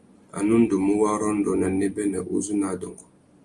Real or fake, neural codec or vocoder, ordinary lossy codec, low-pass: real; none; Opus, 32 kbps; 10.8 kHz